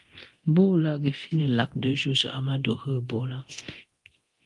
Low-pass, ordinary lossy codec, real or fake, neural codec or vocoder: 10.8 kHz; Opus, 24 kbps; fake; codec, 24 kHz, 0.9 kbps, DualCodec